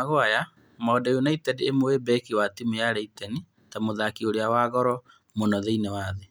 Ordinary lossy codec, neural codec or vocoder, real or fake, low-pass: none; none; real; none